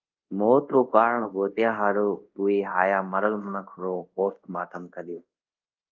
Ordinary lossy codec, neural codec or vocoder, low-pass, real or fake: Opus, 24 kbps; codec, 24 kHz, 0.5 kbps, DualCodec; 7.2 kHz; fake